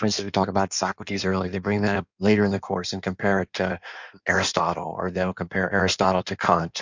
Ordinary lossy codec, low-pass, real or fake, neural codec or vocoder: MP3, 64 kbps; 7.2 kHz; fake; codec, 16 kHz in and 24 kHz out, 1.1 kbps, FireRedTTS-2 codec